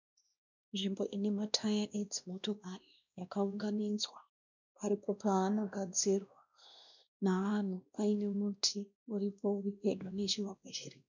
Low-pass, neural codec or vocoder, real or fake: 7.2 kHz; codec, 16 kHz, 1 kbps, X-Codec, WavLM features, trained on Multilingual LibriSpeech; fake